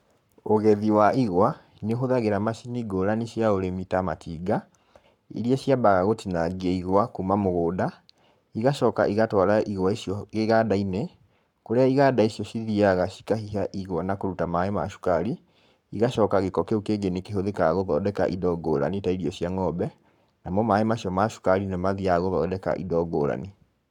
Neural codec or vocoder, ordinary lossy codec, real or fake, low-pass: codec, 44.1 kHz, 7.8 kbps, Pupu-Codec; none; fake; 19.8 kHz